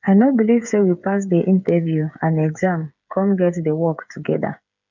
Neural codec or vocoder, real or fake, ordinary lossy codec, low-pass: codec, 16 kHz, 8 kbps, FreqCodec, smaller model; fake; none; 7.2 kHz